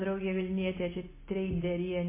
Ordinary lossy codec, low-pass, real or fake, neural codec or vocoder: MP3, 16 kbps; 3.6 kHz; real; none